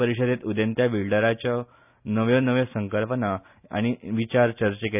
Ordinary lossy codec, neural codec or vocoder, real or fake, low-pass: none; none; real; 3.6 kHz